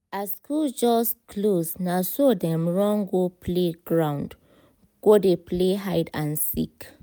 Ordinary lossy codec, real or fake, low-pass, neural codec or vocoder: none; real; none; none